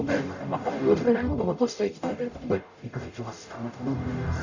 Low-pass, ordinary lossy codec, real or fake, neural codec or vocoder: 7.2 kHz; none; fake; codec, 44.1 kHz, 0.9 kbps, DAC